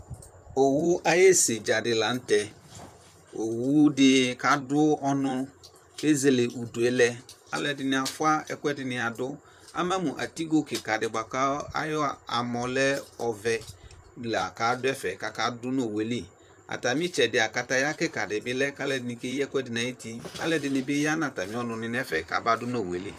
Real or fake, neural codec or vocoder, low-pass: fake; vocoder, 44.1 kHz, 128 mel bands, Pupu-Vocoder; 14.4 kHz